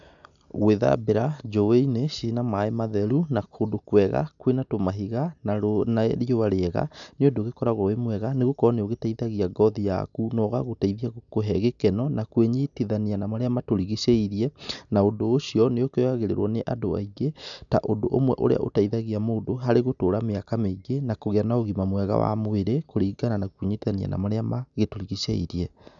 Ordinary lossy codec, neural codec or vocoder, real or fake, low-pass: none; none; real; 7.2 kHz